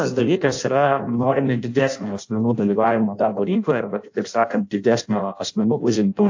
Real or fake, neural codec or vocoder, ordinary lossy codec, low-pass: fake; codec, 16 kHz in and 24 kHz out, 0.6 kbps, FireRedTTS-2 codec; AAC, 48 kbps; 7.2 kHz